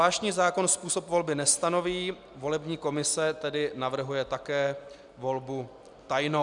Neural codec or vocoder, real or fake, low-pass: none; real; 10.8 kHz